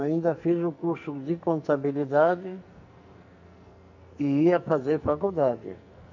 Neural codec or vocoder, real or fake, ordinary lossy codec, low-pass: codec, 44.1 kHz, 2.6 kbps, SNAC; fake; none; 7.2 kHz